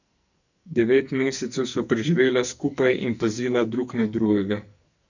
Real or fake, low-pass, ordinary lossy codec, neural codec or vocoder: fake; 7.2 kHz; none; codec, 44.1 kHz, 2.6 kbps, SNAC